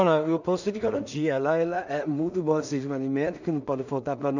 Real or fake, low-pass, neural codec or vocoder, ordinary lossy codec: fake; 7.2 kHz; codec, 16 kHz in and 24 kHz out, 0.4 kbps, LongCat-Audio-Codec, two codebook decoder; none